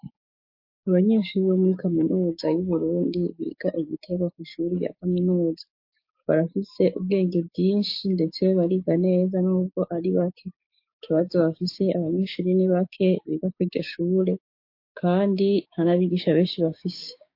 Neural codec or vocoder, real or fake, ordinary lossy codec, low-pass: codec, 16 kHz, 6 kbps, DAC; fake; MP3, 32 kbps; 5.4 kHz